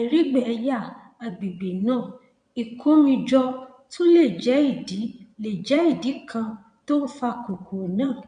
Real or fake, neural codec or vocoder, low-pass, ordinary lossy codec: fake; vocoder, 22.05 kHz, 80 mel bands, Vocos; 9.9 kHz; none